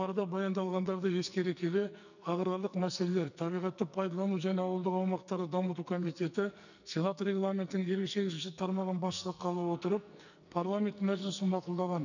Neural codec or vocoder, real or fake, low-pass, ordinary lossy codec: codec, 44.1 kHz, 2.6 kbps, SNAC; fake; 7.2 kHz; none